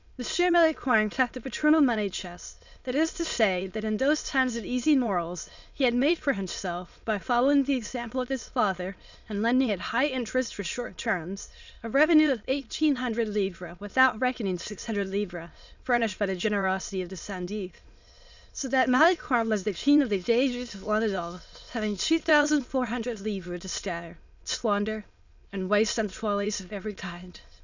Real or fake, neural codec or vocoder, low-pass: fake; autoencoder, 22.05 kHz, a latent of 192 numbers a frame, VITS, trained on many speakers; 7.2 kHz